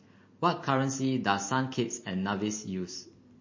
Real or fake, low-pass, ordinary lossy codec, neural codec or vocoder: real; 7.2 kHz; MP3, 32 kbps; none